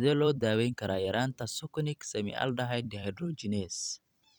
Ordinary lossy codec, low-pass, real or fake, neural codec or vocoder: none; 19.8 kHz; fake; vocoder, 44.1 kHz, 128 mel bands every 256 samples, BigVGAN v2